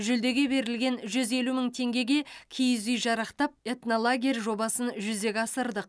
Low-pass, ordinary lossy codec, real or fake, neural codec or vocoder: none; none; real; none